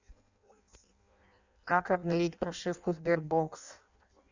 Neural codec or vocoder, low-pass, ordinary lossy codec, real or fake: codec, 16 kHz in and 24 kHz out, 0.6 kbps, FireRedTTS-2 codec; 7.2 kHz; Opus, 64 kbps; fake